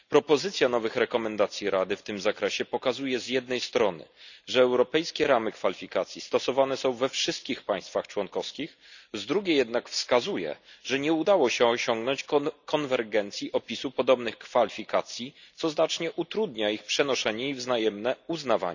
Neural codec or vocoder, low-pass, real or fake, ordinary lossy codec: none; 7.2 kHz; real; none